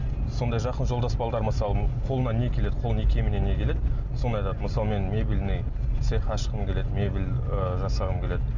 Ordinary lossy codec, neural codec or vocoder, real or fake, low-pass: none; none; real; 7.2 kHz